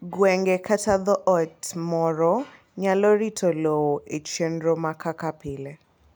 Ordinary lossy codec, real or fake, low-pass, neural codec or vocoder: none; real; none; none